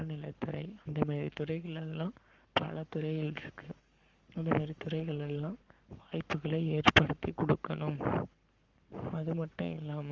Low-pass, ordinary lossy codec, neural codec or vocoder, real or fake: 7.2 kHz; Opus, 32 kbps; codec, 44.1 kHz, 7.8 kbps, Pupu-Codec; fake